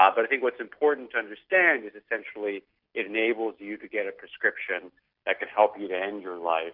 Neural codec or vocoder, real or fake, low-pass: none; real; 5.4 kHz